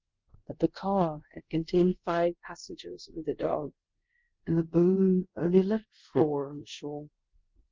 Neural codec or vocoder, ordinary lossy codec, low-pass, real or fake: codec, 24 kHz, 0.5 kbps, DualCodec; Opus, 32 kbps; 7.2 kHz; fake